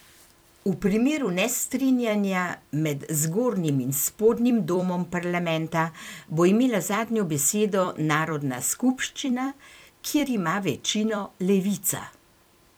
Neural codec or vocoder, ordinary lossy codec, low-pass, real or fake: vocoder, 44.1 kHz, 128 mel bands every 256 samples, BigVGAN v2; none; none; fake